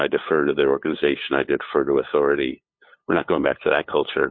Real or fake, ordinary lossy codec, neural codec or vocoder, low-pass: fake; MP3, 32 kbps; codec, 16 kHz, 8 kbps, FunCodec, trained on LibriTTS, 25 frames a second; 7.2 kHz